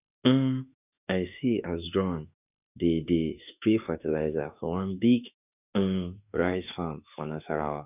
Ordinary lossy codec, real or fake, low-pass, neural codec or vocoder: none; fake; 3.6 kHz; autoencoder, 48 kHz, 32 numbers a frame, DAC-VAE, trained on Japanese speech